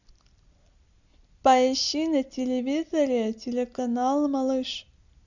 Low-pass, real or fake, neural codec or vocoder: 7.2 kHz; real; none